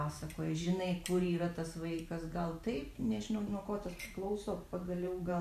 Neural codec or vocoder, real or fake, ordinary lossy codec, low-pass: vocoder, 44.1 kHz, 128 mel bands every 512 samples, BigVGAN v2; fake; AAC, 96 kbps; 14.4 kHz